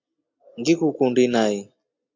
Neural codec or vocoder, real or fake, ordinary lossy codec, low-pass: none; real; MP3, 48 kbps; 7.2 kHz